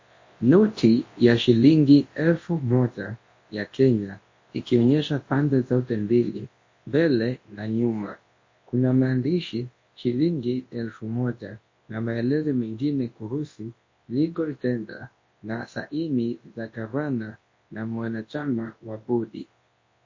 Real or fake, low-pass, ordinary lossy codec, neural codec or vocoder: fake; 7.2 kHz; MP3, 32 kbps; codec, 24 kHz, 0.9 kbps, WavTokenizer, large speech release